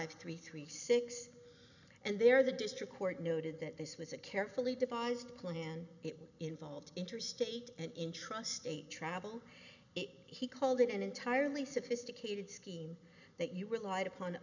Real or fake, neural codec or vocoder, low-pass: real; none; 7.2 kHz